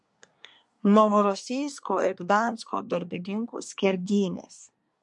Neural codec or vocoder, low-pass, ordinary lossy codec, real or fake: codec, 24 kHz, 1 kbps, SNAC; 10.8 kHz; MP3, 64 kbps; fake